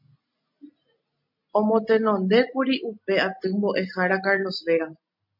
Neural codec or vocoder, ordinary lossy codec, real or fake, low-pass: none; MP3, 48 kbps; real; 5.4 kHz